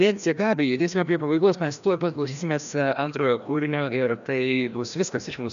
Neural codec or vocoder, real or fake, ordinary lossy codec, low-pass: codec, 16 kHz, 1 kbps, FreqCodec, larger model; fake; MP3, 96 kbps; 7.2 kHz